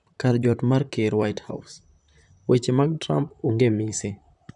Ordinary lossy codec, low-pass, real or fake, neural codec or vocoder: none; 10.8 kHz; fake; vocoder, 44.1 kHz, 128 mel bands, Pupu-Vocoder